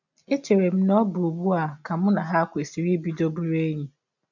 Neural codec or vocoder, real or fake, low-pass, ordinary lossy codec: none; real; 7.2 kHz; none